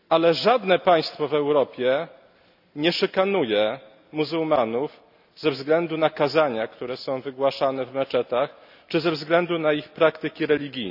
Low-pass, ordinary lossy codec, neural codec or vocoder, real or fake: 5.4 kHz; none; none; real